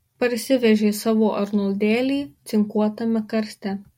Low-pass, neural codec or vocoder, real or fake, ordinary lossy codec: 19.8 kHz; none; real; MP3, 64 kbps